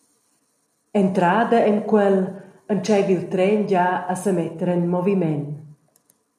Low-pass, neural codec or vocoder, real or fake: 14.4 kHz; none; real